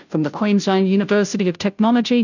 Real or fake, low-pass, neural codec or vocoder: fake; 7.2 kHz; codec, 16 kHz, 0.5 kbps, FunCodec, trained on Chinese and English, 25 frames a second